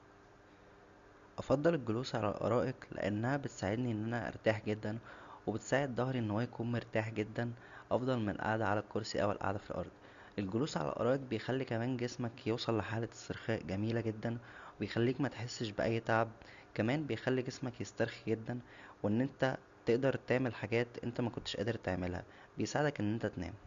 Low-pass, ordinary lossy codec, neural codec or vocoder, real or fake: 7.2 kHz; Opus, 64 kbps; none; real